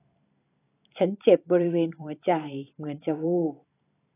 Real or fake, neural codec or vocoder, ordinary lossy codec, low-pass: fake; vocoder, 44.1 kHz, 128 mel bands every 512 samples, BigVGAN v2; AAC, 16 kbps; 3.6 kHz